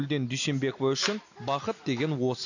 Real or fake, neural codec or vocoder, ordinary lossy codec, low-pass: real; none; none; 7.2 kHz